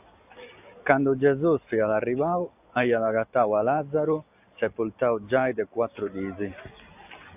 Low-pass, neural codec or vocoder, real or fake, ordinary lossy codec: 3.6 kHz; none; real; AAC, 32 kbps